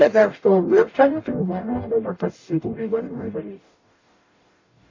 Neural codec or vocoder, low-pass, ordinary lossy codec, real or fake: codec, 44.1 kHz, 0.9 kbps, DAC; 7.2 kHz; AAC, 32 kbps; fake